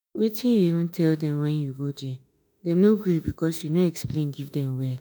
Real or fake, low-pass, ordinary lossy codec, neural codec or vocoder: fake; none; none; autoencoder, 48 kHz, 32 numbers a frame, DAC-VAE, trained on Japanese speech